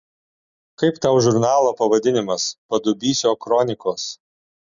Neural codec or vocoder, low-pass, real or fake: none; 7.2 kHz; real